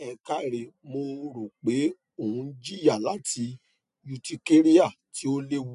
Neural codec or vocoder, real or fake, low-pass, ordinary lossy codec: none; real; 10.8 kHz; MP3, 96 kbps